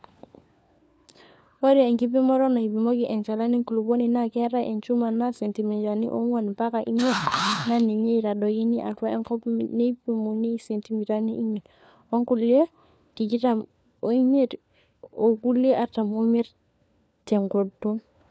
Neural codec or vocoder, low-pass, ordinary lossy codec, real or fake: codec, 16 kHz, 4 kbps, FunCodec, trained on LibriTTS, 50 frames a second; none; none; fake